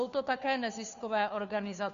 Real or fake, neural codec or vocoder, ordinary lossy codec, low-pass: fake; codec, 16 kHz, 2 kbps, FunCodec, trained on Chinese and English, 25 frames a second; AAC, 96 kbps; 7.2 kHz